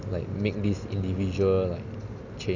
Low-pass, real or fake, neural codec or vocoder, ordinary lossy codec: 7.2 kHz; real; none; none